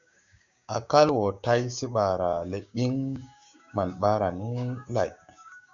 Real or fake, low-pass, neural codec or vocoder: fake; 7.2 kHz; codec, 16 kHz, 6 kbps, DAC